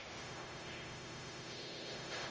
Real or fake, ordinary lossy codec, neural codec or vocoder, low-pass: fake; Opus, 24 kbps; codec, 16 kHz, 0.4 kbps, LongCat-Audio-Codec; 7.2 kHz